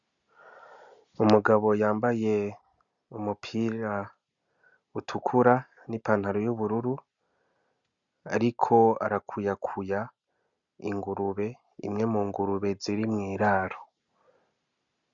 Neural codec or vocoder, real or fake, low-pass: none; real; 7.2 kHz